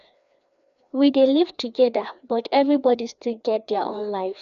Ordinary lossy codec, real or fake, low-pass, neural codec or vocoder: none; fake; 7.2 kHz; codec, 16 kHz, 2 kbps, FreqCodec, larger model